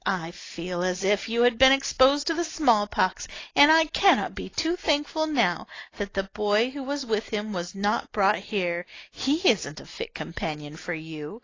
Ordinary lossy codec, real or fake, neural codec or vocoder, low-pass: AAC, 32 kbps; real; none; 7.2 kHz